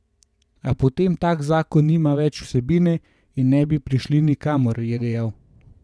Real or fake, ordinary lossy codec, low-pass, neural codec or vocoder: fake; none; none; vocoder, 22.05 kHz, 80 mel bands, WaveNeXt